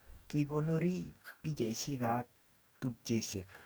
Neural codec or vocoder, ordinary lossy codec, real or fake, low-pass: codec, 44.1 kHz, 2.6 kbps, DAC; none; fake; none